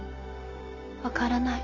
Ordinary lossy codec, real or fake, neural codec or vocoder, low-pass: none; real; none; 7.2 kHz